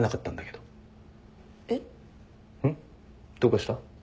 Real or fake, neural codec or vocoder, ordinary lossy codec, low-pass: real; none; none; none